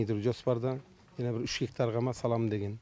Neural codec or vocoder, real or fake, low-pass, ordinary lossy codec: none; real; none; none